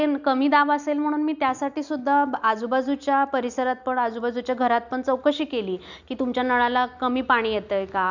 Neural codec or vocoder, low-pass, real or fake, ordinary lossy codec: none; 7.2 kHz; real; none